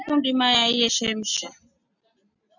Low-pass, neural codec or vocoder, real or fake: 7.2 kHz; none; real